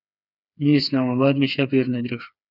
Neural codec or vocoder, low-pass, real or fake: codec, 16 kHz, 4 kbps, FreqCodec, smaller model; 5.4 kHz; fake